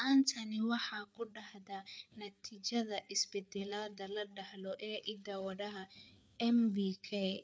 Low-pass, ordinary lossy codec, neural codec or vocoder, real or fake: none; none; codec, 16 kHz, 4 kbps, FreqCodec, larger model; fake